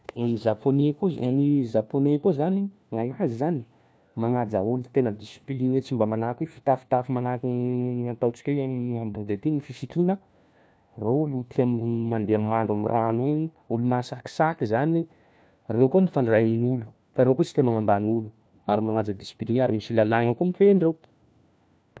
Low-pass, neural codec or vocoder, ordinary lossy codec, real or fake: none; codec, 16 kHz, 1 kbps, FunCodec, trained on LibriTTS, 50 frames a second; none; fake